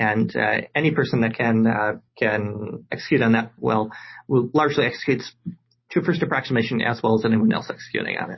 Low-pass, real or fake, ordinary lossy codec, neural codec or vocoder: 7.2 kHz; real; MP3, 24 kbps; none